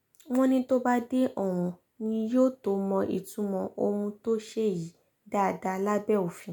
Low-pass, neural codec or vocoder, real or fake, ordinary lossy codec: none; none; real; none